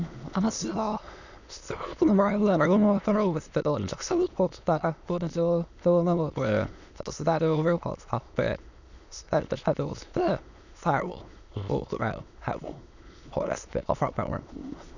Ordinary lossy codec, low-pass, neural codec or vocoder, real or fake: none; 7.2 kHz; autoencoder, 22.05 kHz, a latent of 192 numbers a frame, VITS, trained on many speakers; fake